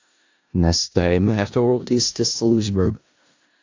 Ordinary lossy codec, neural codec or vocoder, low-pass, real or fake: AAC, 48 kbps; codec, 16 kHz in and 24 kHz out, 0.4 kbps, LongCat-Audio-Codec, four codebook decoder; 7.2 kHz; fake